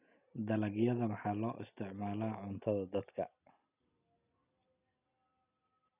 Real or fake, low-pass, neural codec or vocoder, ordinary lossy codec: real; 3.6 kHz; none; none